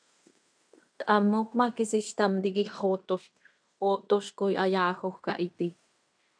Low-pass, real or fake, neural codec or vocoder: 9.9 kHz; fake; codec, 16 kHz in and 24 kHz out, 0.9 kbps, LongCat-Audio-Codec, fine tuned four codebook decoder